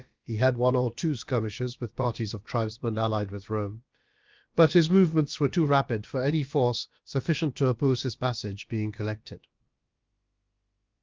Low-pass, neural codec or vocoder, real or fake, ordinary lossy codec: 7.2 kHz; codec, 16 kHz, about 1 kbps, DyCAST, with the encoder's durations; fake; Opus, 32 kbps